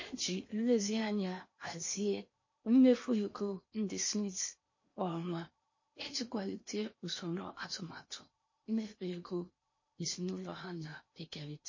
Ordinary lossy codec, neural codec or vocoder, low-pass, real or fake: MP3, 32 kbps; codec, 16 kHz in and 24 kHz out, 0.8 kbps, FocalCodec, streaming, 65536 codes; 7.2 kHz; fake